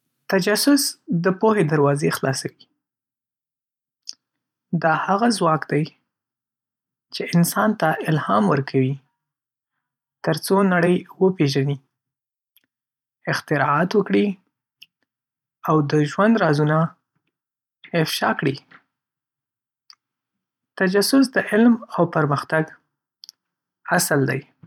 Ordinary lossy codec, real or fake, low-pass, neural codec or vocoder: none; fake; 19.8 kHz; vocoder, 44.1 kHz, 128 mel bands every 512 samples, BigVGAN v2